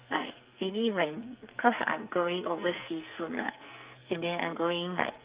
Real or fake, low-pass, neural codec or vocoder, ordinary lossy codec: fake; 3.6 kHz; codec, 44.1 kHz, 2.6 kbps, SNAC; Opus, 24 kbps